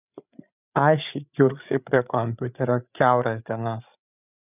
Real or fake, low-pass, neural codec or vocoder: fake; 3.6 kHz; codec, 16 kHz, 8 kbps, FreqCodec, larger model